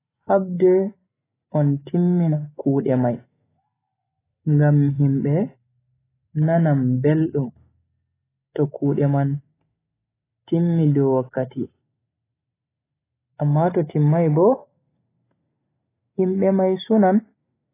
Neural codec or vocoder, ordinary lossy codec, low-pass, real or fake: none; AAC, 16 kbps; 3.6 kHz; real